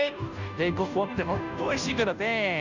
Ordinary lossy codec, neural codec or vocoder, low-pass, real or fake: none; codec, 16 kHz, 0.5 kbps, FunCodec, trained on Chinese and English, 25 frames a second; 7.2 kHz; fake